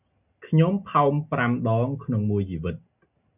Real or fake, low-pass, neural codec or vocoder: real; 3.6 kHz; none